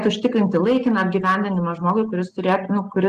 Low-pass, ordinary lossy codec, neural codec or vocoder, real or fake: 14.4 kHz; Opus, 24 kbps; none; real